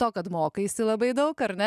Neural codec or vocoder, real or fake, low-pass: none; real; 14.4 kHz